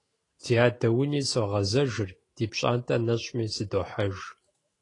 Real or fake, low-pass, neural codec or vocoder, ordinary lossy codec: fake; 10.8 kHz; autoencoder, 48 kHz, 128 numbers a frame, DAC-VAE, trained on Japanese speech; AAC, 32 kbps